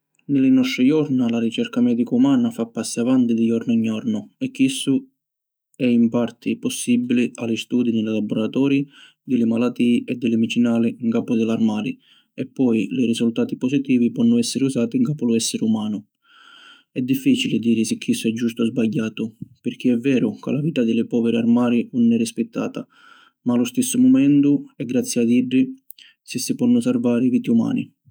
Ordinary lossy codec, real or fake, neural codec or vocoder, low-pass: none; fake; autoencoder, 48 kHz, 128 numbers a frame, DAC-VAE, trained on Japanese speech; none